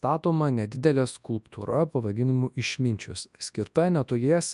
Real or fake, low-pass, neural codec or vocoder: fake; 10.8 kHz; codec, 24 kHz, 0.9 kbps, WavTokenizer, large speech release